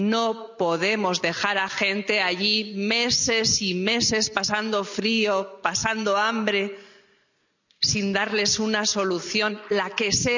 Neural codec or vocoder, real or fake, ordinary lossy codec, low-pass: none; real; none; 7.2 kHz